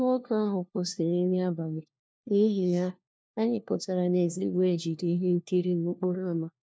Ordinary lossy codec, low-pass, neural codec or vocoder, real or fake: none; none; codec, 16 kHz, 1 kbps, FunCodec, trained on LibriTTS, 50 frames a second; fake